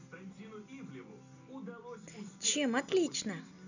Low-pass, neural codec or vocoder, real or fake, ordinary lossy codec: 7.2 kHz; none; real; none